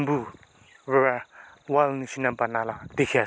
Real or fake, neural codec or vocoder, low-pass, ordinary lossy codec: real; none; none; none